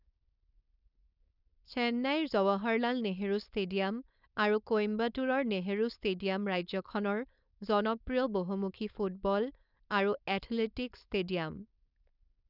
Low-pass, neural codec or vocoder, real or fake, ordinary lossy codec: 5.4 kHz; codec, 16 kHz, 4.8 kbps, FACodec; fake; none